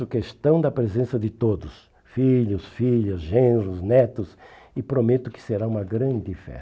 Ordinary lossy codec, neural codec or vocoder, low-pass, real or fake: none; none; none; real